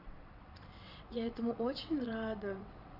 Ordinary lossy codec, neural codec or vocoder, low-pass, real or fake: none; vocoder, 22.05 kHz, 80 mel bands, WaveNeXt; 5.4 kHz; fake